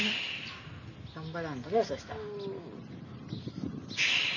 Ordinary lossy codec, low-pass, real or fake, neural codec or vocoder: none; 7.2 kHz; real; none